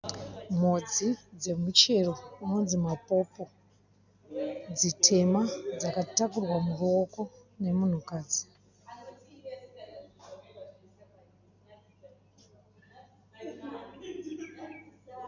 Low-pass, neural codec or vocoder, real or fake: 7.2 kHz; none; real